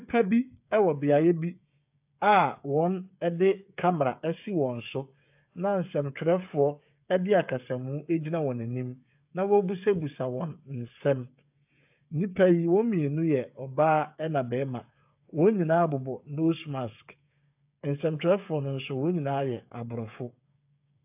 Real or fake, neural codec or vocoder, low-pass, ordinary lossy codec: fake; codec, 16 kHz, 8 kbps, FreqCodec, smaller model; 3.6 kHz; AAC, 32 kbps